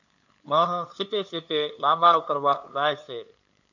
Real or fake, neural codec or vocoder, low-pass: fake; codec, 16 kHz, 2 kbps, FunCodec, trained on LibriTTS, 25 frames a second; 7.2 kHz